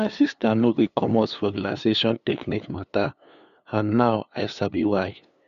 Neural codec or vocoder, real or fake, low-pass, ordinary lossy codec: codec, 16 kHz, 2 kbps, FunCodec, trained on LibriTTS, 25 frames a second; fake; 7.2 kHz; none